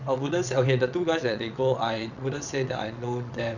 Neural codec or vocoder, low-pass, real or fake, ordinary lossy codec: vocoder, 22.05 kHz, 80 mel bands, WaveNeXt; 7.2 kHz; fake; none